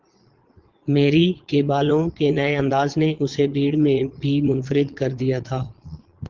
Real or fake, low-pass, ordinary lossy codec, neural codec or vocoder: fake; 7.2 kHz; Opus, 16 kbps; vocoder, 22.05 kHz, 80 mel bands, Vocos